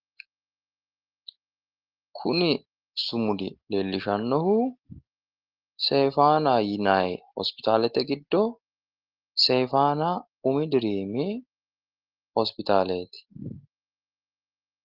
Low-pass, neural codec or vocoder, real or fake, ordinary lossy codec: 5.4 kHz; none; real; Opus, 16 kbps